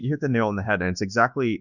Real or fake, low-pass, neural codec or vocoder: fake; 7.2 kHz; codec, 24 kHz, 1.2 kbps, DualCodec